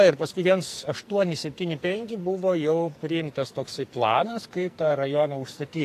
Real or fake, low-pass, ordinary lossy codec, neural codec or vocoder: fake; 14.4 kHz; AAC, 64 kbps; codec, 44.1 kHz, 2.6 kbps, SNAC